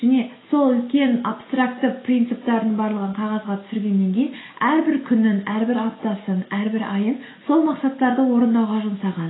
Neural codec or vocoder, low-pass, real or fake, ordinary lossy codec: none; 7.2 kHz; real; AAC, 16 kbps